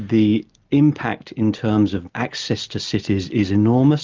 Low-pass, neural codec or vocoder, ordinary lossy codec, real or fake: 7.2 kHz; none; Opus, 24 kbps; real